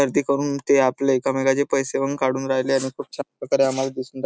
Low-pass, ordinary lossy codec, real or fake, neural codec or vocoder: none; none; real; none